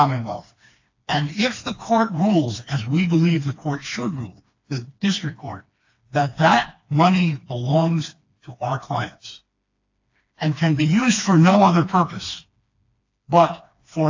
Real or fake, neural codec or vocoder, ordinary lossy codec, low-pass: fake; codec, 16 kHz, 2 kbps, FreqCodec, smaller model; AAC, 48 kbps; 7.2 kHz